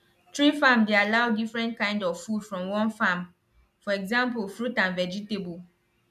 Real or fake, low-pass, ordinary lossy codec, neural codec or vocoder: real; 14.4 kHz; none; none